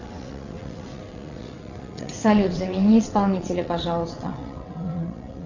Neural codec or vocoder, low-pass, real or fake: vocoder, 22.05 kHz, 80 mel bands, WaveNeXt; 7.2 kHz; fake